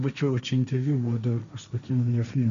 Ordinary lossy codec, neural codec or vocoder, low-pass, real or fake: AAC, 96 kbps; codec, 16 kHz, 1.1 kbps, Voila-Tokenizer; 7.2 kHz; fake